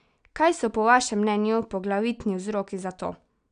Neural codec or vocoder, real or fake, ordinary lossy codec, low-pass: none; real; none; 9.9 kHz